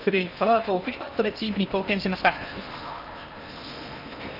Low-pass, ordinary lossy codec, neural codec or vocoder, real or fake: 5.4 kHz; none; codec, 16 kHz in and 24 kHz out, 0.8 kbps, FocalCodec, streaming, 65536 codes; fake